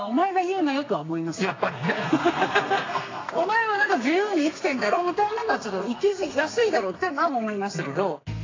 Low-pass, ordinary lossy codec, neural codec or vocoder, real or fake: 7.2 kHz; AAC, 32 kbps; codec, 32 kHz, 1.9 kbps, SNAC; fake